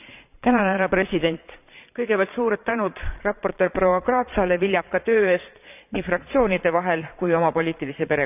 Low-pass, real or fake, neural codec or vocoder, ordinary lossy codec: 3.6 kHz; fake; vocoder, 22.05 kHz, 80 mel bands, Vocos; none